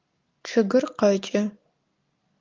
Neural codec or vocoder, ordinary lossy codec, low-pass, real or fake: none; Opus, 24 kbps; 7.2 kHz; real